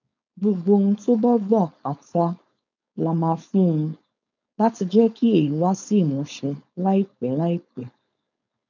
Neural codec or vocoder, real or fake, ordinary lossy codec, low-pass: codec, 16 kHz, 4.8 kbps, FACodec; fake; none; 7.2 kHz